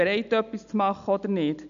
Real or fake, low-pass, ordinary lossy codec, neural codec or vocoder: real; 7.2 kHz; none; none